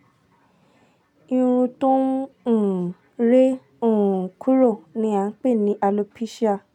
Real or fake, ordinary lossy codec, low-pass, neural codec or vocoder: real; none; 19.8 kHz; none